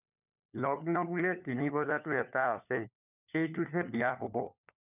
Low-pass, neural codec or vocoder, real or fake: 3.6 kHz; codec, 16 kHz, 4 kbps, FunCodec, trained on LibriTTS, 50 frames a second; fake